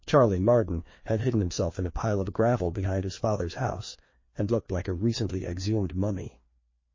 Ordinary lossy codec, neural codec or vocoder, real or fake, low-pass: MP3, 32 kbps; codec, 16 kHz, 2 kbps, FreqCodec, larger model; fake; 7.2 kHz